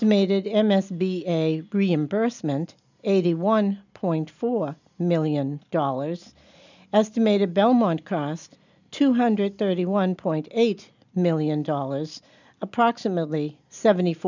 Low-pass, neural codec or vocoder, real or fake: 7.2 kHz; none; real